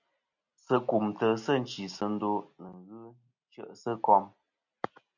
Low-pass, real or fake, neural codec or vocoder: 7.2 kHz; real; none